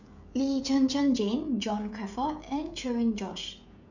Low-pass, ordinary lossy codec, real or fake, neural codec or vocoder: 7.2 kHz; none; fake; codec, 16 kHz, 16 kbps, FreqCodec, smaller model